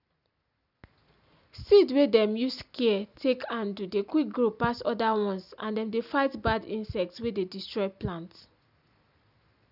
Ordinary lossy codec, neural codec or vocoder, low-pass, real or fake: none; none; 5.4 kHz; real